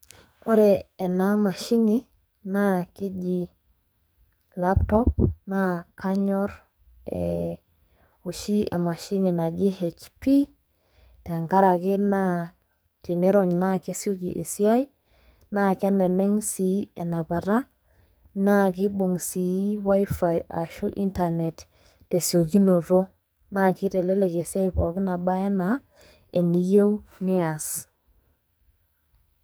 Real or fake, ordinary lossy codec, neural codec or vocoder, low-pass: fake; none; codec, 44.1 kHz, 2.6 kbps, SNAC; none